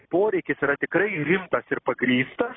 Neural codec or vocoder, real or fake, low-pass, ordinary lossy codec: none; real; 7.2 kHz; AAC, 16 kbps